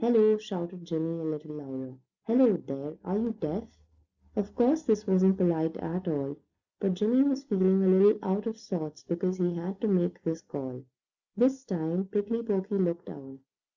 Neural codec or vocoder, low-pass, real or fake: none; 7.2 kHz; real